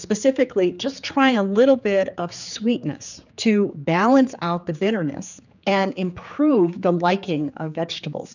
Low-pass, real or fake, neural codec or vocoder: 7.2 kHz; fake; codec, 16 kHz, 4 kbps, X-Codec, HuBERT features, trained on general audio